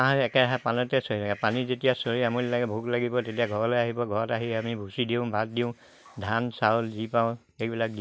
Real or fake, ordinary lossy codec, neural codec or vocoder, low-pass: real; none; none; none